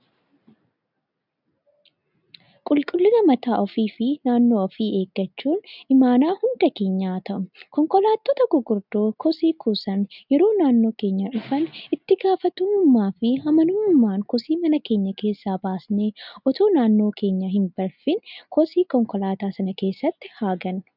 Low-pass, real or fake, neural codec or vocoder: 5.4 kHz; real; none